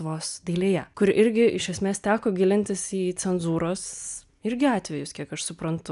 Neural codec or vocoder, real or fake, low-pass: none; real; 10.8 kHz